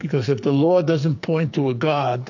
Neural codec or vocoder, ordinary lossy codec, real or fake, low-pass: codec, 44.1 kHz, 2.6 kbps, SNAC; AAC, 48 kbps; fake; 7.2 kHz